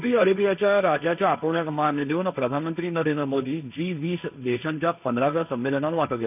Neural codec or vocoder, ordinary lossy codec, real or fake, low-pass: codec, 16 kHz, 1.1 kbps, Voila-Tokenizer; none; fake; 3.6 kHz